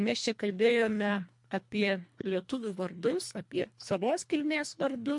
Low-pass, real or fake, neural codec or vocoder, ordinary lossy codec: 10.8 kHz; fake; codec, 24 kHz, 1.5 kbps, HILCodec; MP3, 64 kbps